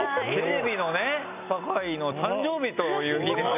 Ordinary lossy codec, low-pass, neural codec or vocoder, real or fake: none; 3.6 kHz; none; real